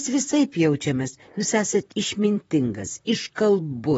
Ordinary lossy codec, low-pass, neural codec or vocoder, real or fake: AAC, 24 kbps; 19.8 kHz; vocoder, 44.1 kHz, 128 mel bands, Pupu-Vocoder; fake